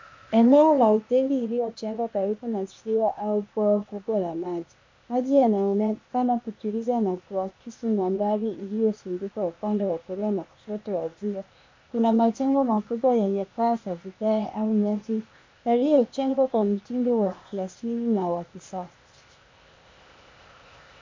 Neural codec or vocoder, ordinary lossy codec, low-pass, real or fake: codec, 16 kHz, 0.8 kbps, ZipCodec; MP3, 64 kbps; 7.2 kHz; fake